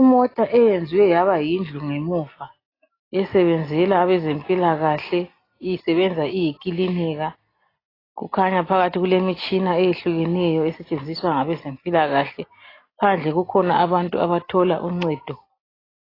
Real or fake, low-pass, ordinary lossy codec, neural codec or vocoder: real; 5.4 kHz; AAC, 24 kbps; none